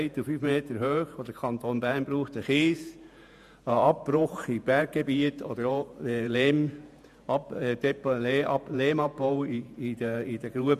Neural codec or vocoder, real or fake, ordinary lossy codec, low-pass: vocoder, 48 kHz, 128 mel bands, Vocos; fake; MP3, 96 kbps; 14.4 kHz